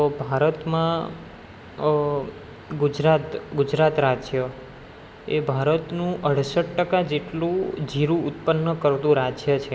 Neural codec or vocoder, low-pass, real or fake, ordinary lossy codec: none; none; real; none